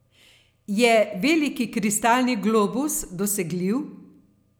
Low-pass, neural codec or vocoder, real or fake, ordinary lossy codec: none; none; real; none